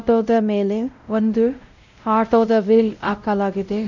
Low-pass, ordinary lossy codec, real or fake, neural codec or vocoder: 7.2 kHz; none; fake; codec, 16 kHz, 0.5 kbps, X-Codec, WavLM features, trained on Multilingual LibriSpeech